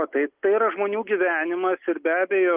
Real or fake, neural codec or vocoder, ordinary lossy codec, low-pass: real; none; Opus, 32 kbps; 3.6 kHz